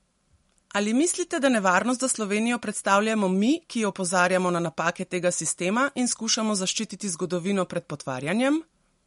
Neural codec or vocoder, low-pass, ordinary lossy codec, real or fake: none; 14.4 kHz; MP3, 48 kbps; real